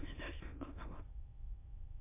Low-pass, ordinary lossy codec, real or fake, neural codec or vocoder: 3.6 kHz; MP3, 24 kbps; fake; autoencoder, 22.05 kHz, a latent of 192 numbers a frame, VITS, trained on many speakers